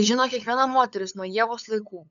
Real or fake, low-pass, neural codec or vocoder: fake; 7.2 kHz; codec, 16 kHz, 8 kbps, FunCodec, trained on LibriTTS, 25 frames a second